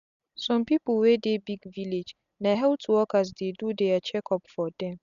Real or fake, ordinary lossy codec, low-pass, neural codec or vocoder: real; none; 7.2 kHz; none